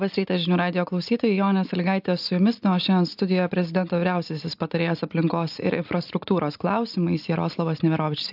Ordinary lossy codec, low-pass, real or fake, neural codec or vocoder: AAC, 48 kbps; 5.4 kHz; real; none